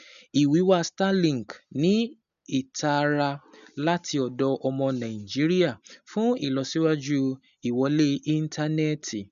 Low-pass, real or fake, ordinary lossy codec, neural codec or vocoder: 7.2 kHz; real; none; none